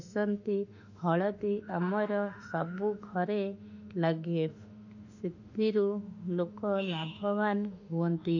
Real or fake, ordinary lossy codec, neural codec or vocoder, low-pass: fake; none; autoencoder, 48 kHz, 32 numbers a frame, DAC-VAE, trained on Japanese speech; 7.2 kHz